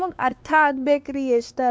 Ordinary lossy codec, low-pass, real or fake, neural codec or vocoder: none; none; fake; codec, 16 kHz, 2 kbps, X-Codec, WavLM features, trained on Multilingual LibriSpeech